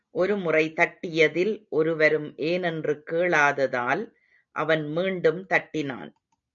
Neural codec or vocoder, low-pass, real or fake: none; 7.2 kHz; real